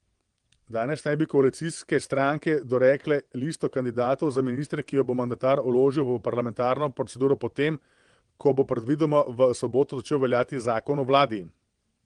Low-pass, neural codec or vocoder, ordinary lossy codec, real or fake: 9.9 kHz; vocoder, 22.05 kHz, 80 mel bands, WaveNeXt; Opus, 24 kbps; fake